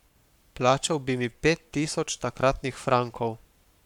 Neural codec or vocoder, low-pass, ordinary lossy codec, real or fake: codec, 44.1 kHz, 7.8 kbps, Pupu-Codec; 19.8 kHz; none; fake